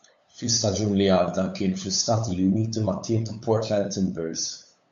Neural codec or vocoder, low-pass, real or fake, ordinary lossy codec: codec, 16 kHz, 8 kbps, FunCodec, trained on LibriTTS, 25 frames a second; 7.2 kHz; fake; AAC, 64 kbps